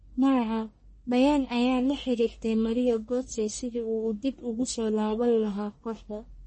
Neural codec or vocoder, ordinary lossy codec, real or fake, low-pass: codec, 44.1 kHz, 1.7 kbps, Pupu-Codec; MP3, 32 kbps; fake; 10.8 kHz